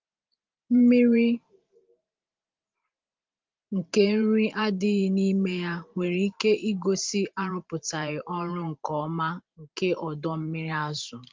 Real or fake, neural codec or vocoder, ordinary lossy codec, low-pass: fake; vocoder, 44.1 kHz, 128 mel bands every 512 samples, BigVGAN v2; Opus, 32 kbps; 7.2 kHz